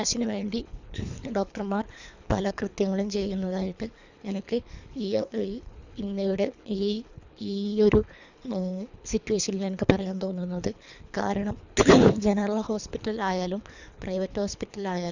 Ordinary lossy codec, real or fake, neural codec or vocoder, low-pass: none; fake; codec, 24 kHz, 3 kbps, HILCodec; 7.2 kHz